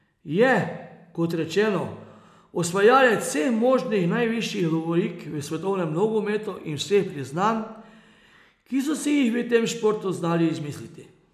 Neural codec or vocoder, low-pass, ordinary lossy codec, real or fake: none; 14.4 kHz; none; real